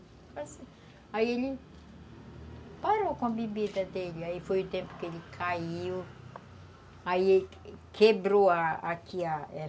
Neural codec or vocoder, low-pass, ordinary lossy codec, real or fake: none; none; none; real